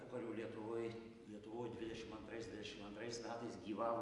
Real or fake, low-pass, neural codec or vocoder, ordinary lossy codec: real; 10.8 kHz; none; AAC, 48 kbps